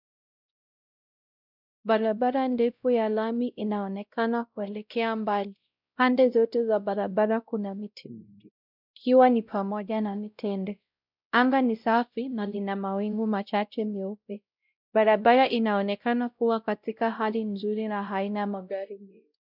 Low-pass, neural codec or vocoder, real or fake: 5.4 kHz; codec, 16 kHz, 0.5 kbps, X-Codec, WavLM features, trained on Multilingual LibriSpeech; fake